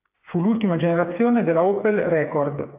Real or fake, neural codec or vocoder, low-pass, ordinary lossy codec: fake; codec, 16 kHz, 4 kbps, FreqCodec, smaller model; 3.6 kHz; AAC, 32 kbps